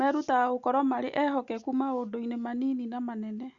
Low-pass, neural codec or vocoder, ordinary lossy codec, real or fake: 7.2 kHz; none; Opus, 64 kbps; real